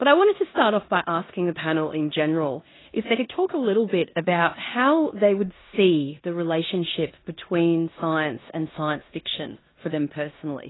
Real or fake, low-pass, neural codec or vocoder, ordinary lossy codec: fake; 7.2 kHz; codec, 16 kHz in and 24 kHz out, 0.9 kbps, LongCat-Audio-Codec, four codebook decoder; AAC, 16 kbps